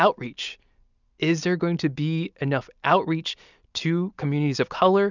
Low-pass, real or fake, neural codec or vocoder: 7.2 kHz; real; none